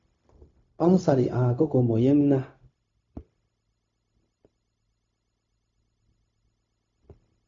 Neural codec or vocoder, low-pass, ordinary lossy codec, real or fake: codec, 16 kHz, 0.4 kbps, LongCat-Audio-Codec; 7.2 kHz; AAC, 48 kbps; fake